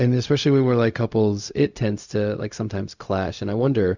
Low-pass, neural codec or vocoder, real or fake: 7.2 kHz; codec, 16 kHz, 0.4 kbps, LongCat-Audio-Codec; fake